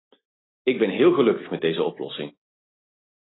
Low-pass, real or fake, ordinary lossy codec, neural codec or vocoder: 7.2 kHz; real; AAC, 16 kbps; none